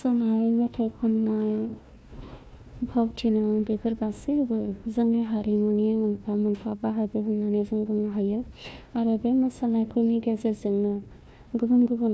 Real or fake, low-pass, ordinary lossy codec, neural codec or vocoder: fake; none; none; codec, 16 kHz, 1 kbps, FunCodec, trained on Chinese and English, 50 frames a second